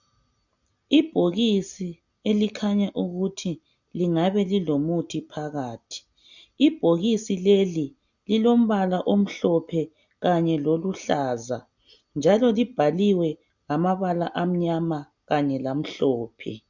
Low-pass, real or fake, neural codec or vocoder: 7.2 kHz; real; none